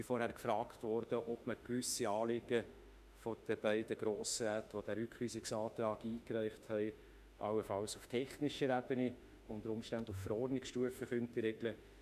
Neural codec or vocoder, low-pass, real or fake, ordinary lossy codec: autoencoder, 48 kHz, 32 numbers a frame, DAC-VAE, trained on Japanese speech; 14.4 kHz; fake; none